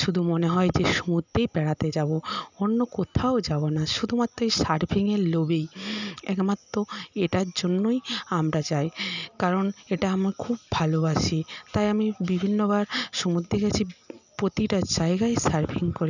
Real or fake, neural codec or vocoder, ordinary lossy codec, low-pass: real; none; none; 7.2 kHz